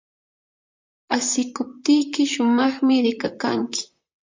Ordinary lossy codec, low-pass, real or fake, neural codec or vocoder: MP3, 64 kbps; 7.2 kHz; fake; vocoder, 44.1 kHz, 128 mel bands, Pupu-Vocoder